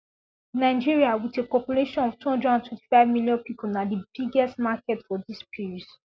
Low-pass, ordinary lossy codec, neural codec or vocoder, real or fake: none; none; none; real